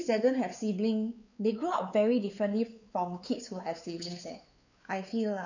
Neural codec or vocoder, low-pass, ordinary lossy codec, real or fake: codec, 16 kHz, 4 kbps, X-Codec, WavLM features, trained on Multilingual LibriSpeech; 7.2 kHz; none; fake